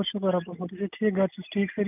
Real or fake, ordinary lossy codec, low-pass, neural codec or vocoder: real; none; 3.6 kHz; none